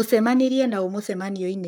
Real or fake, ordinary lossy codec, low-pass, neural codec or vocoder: fake; none; none; codec, 44.1 kHz, 7.8 kbps, Pupu-Codec